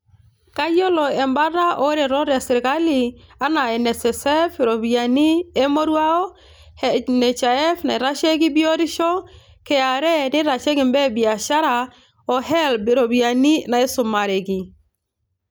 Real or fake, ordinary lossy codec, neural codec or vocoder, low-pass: real; none; none; none